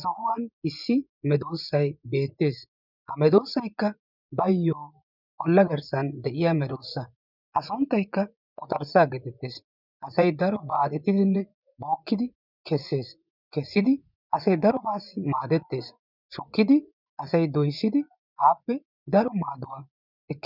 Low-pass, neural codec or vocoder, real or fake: 5.4 kHz; vocoder, 24 kHz, 100 mel bands, Vocos; fake